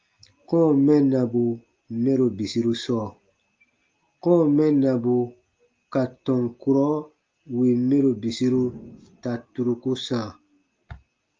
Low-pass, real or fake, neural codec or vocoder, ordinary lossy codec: 7.2 kHz; real; none; Opus, 24 kbps